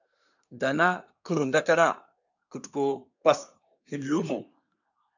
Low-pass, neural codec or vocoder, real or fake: 7.2 kHz; codec, 24 kHz, 1 kbps, SNAC; fake